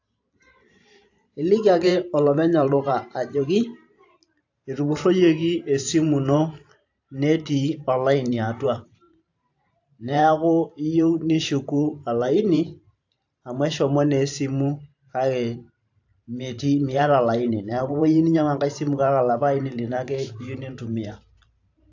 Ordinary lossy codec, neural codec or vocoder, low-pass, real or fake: AAC, 48 kbps; vocoder, 44.1 kHz, 128 mel bands every 512 samples, BigVGAN v2; 7.2 kHz; fake